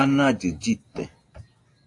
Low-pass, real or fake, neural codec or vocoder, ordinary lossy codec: 10.8 kHz; real; none; AAC, 48 kbps